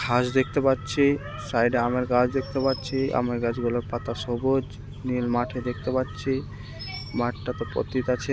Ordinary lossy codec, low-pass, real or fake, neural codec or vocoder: none; none; real; none